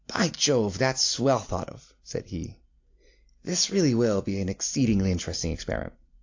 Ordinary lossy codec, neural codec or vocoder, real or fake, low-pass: AAC, 48 kbps; none; real; 7.2 kHz